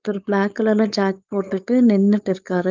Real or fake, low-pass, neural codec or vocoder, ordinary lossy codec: fake; 7.2 kHz; codec, 16 kHz, 4.8 kbps, FACodec; Opus, 24 kbps